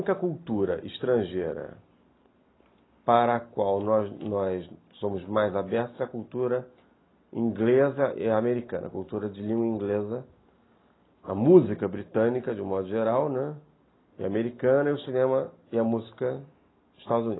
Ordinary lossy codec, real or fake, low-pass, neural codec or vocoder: AAC, 16 kbps; real; 7.2 kHz; none